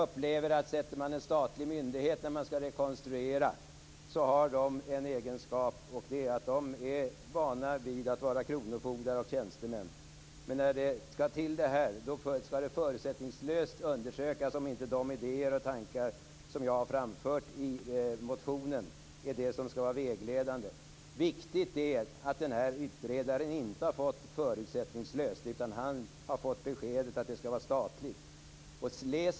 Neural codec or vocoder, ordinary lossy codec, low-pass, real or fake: none; none; none; real